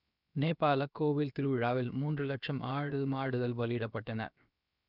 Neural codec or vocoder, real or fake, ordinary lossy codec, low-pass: codec, 16 kHz, about 1 kbps, DyCAST, with the encoder's durations; fake; none; 5.4 kHz